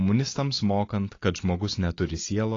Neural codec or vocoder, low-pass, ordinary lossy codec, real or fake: none; 7.2 kHz; AAC, 32 kbps; real